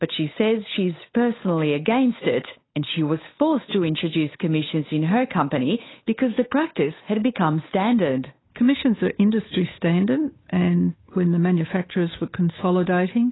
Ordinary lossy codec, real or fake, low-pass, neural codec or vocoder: AAC, 16 kbps; fake; 7.2 kHz; codec, 16 kHz, 8 kbps, FunCodec, trained on Chinese and English, 25 frames a second